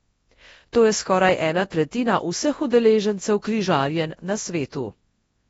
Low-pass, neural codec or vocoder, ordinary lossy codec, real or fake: 10.8 kHz; codec, 24 kHz, 0.9 kbps, WavTokenizer, large speech release; AAC, 24 kbps; fake